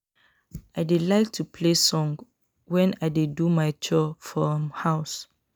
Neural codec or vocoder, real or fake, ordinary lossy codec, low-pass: none; real; none; none